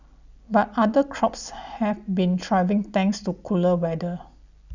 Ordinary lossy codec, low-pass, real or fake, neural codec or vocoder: none; 7.2 kHz; real; none